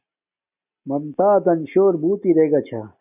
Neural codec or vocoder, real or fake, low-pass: none; real; 3.6 kHz